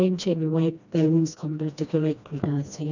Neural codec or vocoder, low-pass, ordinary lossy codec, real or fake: codec, 16 kHz, 1 kbps, FreqCodec, smaller model; 7.2 kHz; none; fake